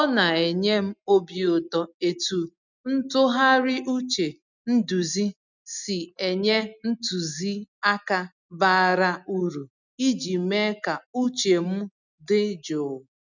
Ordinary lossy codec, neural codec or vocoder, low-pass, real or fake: none; none; 7.2 kHz; real